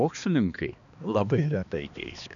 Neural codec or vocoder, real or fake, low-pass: codec, 16 kHz, 2 kbps, X-Codec, HuBERT features, trained on balanced general audio; fake; 7.2 kHz